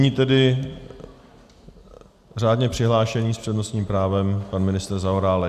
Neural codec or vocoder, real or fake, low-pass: none; real; 14.4 kHz